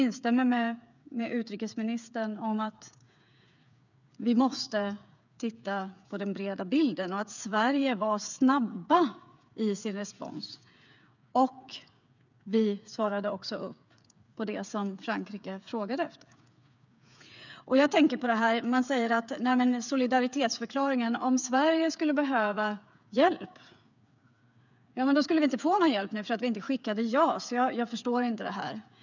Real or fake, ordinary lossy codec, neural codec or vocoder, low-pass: fake; none; codec, 16 kHz, 8 kbps, FreqCodec, smaller model; 7.2 kHz